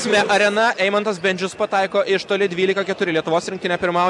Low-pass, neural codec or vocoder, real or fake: 10.8 kHz; none; real